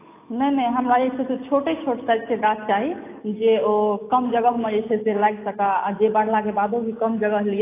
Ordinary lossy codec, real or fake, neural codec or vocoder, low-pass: AAC, 24 kbps; real; none; 3.6 kHz